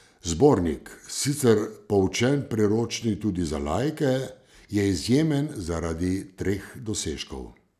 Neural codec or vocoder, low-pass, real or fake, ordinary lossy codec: none; 14.4 kHz; real; none